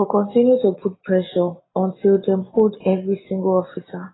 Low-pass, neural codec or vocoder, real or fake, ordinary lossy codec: 7.2 kHz; none; real; AAC, 16 kbps